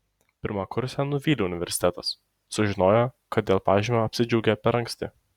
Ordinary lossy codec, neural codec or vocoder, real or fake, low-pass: Opus, 64 kbps; none; real; 19.8 kHz